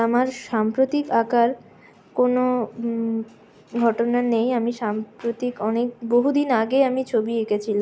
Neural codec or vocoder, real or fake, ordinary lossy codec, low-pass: none; real; none; none